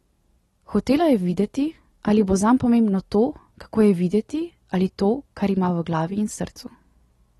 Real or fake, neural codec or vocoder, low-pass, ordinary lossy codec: real; none; 19.8 kHz; AAC, 32 kbps